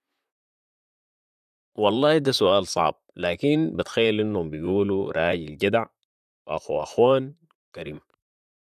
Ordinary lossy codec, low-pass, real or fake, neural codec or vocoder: none; 14.4 kHz; fake; vocoder, 44.1 kHz, 128 mel bands, Pupu-Vocoder